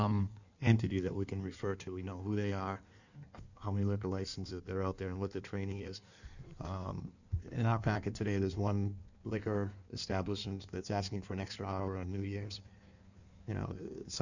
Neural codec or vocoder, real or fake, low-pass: codec, 16 kHz in and 24 kHz out, 1.1 kbps, FireRedTTS-2 codec; fake; 7.2 kHz